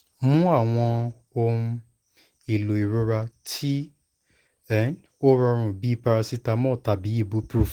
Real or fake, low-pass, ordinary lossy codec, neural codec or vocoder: real; 19.8 kHz; Opus, 16 kbps; none